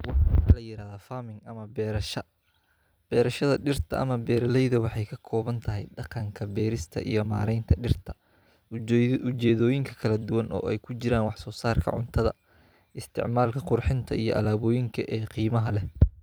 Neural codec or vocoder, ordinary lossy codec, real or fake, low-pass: vocoder, 44.1 kHz, 128 mel bands every 256 samples, BigVGAN v2; none; fake; none